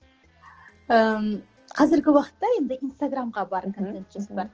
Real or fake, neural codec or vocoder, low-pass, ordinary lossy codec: real; none; 7.2 kHz; Opus, 16 kbps